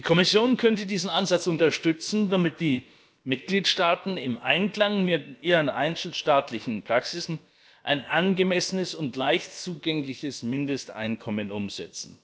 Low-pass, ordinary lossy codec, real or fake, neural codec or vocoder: none; none; fake; codec, 16 kHz, about 1 kbps, DyCAST, with the encoder's durations